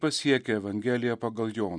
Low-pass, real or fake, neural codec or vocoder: 9.9 kHz; real; none